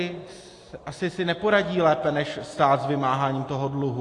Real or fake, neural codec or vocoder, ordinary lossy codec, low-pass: real; none; AAC, 48 kbps; 10.8 kHz